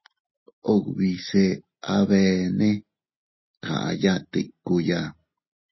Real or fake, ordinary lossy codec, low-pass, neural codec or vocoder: real; MP3, 24 kbps; 7.2 kHz; none